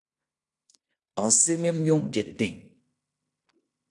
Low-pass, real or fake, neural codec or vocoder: 10.8 kHz; fake; codec, 16 kHz in and 24 kHz out, 0.9 kbps, LongCat-Audio-Codec, fine tuned four codebook decoder